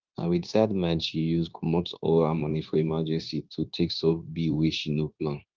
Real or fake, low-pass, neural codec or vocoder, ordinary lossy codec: fake; 7.2 kHz; codec, 16 kHz, 0.9 kbps, LongCat-Audio-Codec; Opus, 32 kbps